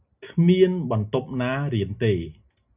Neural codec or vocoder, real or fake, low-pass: none; real; 3.6 kHz